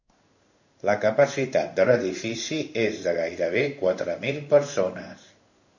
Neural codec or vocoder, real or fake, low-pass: codec, 16 kHz in and 24 kHz out, 1 kbps, XY-Tokenizer; fake; 7.2 kHz